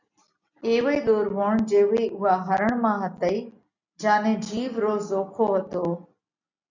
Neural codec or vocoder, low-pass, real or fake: none; 7.2 kHz; real